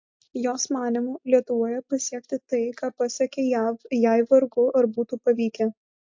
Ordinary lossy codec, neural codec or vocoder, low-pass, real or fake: MP3, 48 kbps; none; 7.2 kHz; real